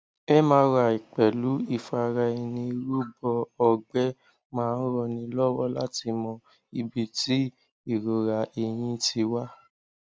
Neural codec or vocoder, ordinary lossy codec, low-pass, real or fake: none; none; none; real